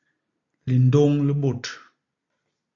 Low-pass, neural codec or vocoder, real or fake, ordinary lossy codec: 7.2 kHz; none; real; AAC, 64 kbps